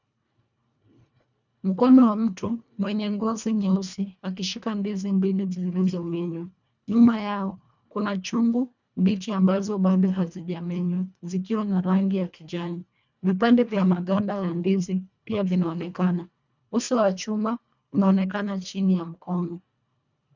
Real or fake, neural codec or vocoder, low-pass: fake; codec, 24 kHz, 1.5 kbps, HILCodec; 7.2 kHz